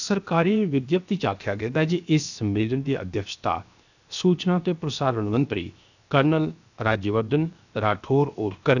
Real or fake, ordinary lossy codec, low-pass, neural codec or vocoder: fake; none; 7.2 kHz; codec, 16 kHz, 0.7 kbps, FocalCodec